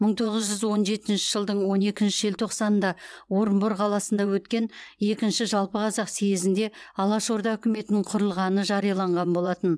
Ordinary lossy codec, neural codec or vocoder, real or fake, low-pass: none; vocoder, 22.05 kHz, 80 mel bands, WaveNeXt; fake; none